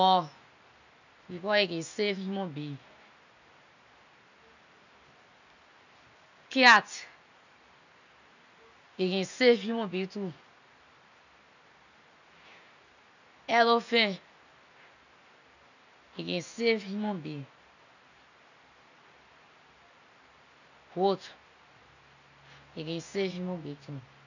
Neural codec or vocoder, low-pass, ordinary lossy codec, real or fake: none; 7.2 kHz; none; real